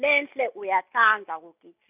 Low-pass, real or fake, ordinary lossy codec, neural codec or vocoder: 3.6 kHz; real; none; none